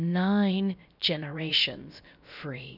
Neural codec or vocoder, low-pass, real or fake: codec, 16 kHz, about 1 kbps, DyCAST, with the encoder's durations; 5.4 kHz; fake